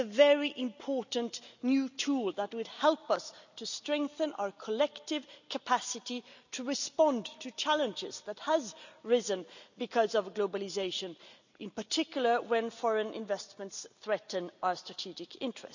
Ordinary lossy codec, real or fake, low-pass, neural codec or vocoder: none; real; 7.2 kHz; none